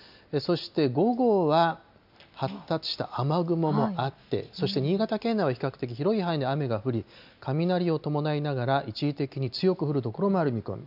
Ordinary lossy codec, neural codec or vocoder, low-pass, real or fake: none; none; 5.4 kHz; real